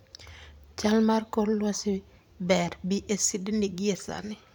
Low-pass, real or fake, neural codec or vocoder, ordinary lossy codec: 19.8 kHz; fake; vocoder, 44.1 kHz, 128 mel bands every 512 samples, BigVGAN v2; none